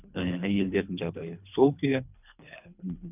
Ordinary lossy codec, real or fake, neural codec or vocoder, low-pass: none; fake; codec, 24 kHz, 3 kbps, HILCodec; 3.6 kHz